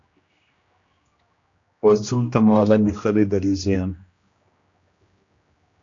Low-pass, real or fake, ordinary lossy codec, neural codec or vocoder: 7.2 kHz; fake; AAC, 48 kbps; codec, 16 kHz, 1 kbps, X-Codec, HuBERT features, trained on general audio